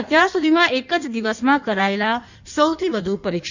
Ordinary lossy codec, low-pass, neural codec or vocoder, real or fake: none; 7.2 kHz; codec, 16 kHz in and 24 kHz out, 1.1 kbps, FireRedTTS-2 codec; fake